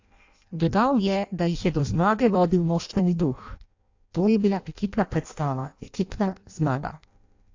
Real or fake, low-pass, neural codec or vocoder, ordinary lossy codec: fake; 7.2 kHz; codec, 16 kHz in and 24 kHz out, 0.6 kbps, FireRedTTS-2 codec; AAC, 48 kbps